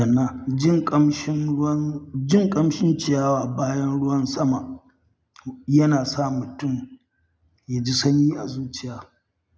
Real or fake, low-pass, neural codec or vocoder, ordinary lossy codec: real; none; none; none